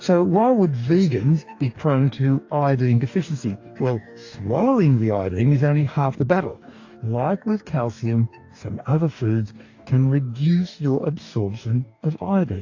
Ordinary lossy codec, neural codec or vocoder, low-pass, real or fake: AAC, 48 kbps; codec, 44.1 kHz, 2.6 kbps, DAC; 7.2 kHz; fake